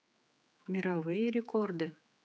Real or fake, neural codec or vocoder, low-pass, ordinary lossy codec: fake; codec, 16 kHz, 4 kbps, X-Codec, HuBERT features, trained on general audio; none; none